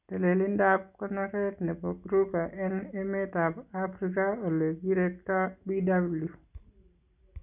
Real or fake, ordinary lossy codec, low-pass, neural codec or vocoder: real; none; 3.6 kHz; none